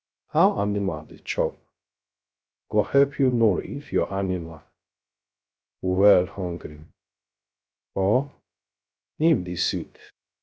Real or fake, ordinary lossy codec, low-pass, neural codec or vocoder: fake; none; none; codec, 16 kHz, 0.3 kbps, FocalCodec